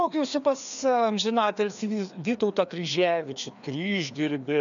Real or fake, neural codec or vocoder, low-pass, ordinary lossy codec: fake; codec, 16 kHz, 2 kbps, FreqCodec, larger model; 7.2 kHz; MP3, 96 kbps